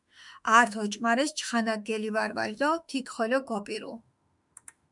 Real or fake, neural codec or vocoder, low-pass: fake; autoencoder, 48 kHz, 32 numbers a frame, DAC-VAE, trained on Japanese speech; 10.8 kHz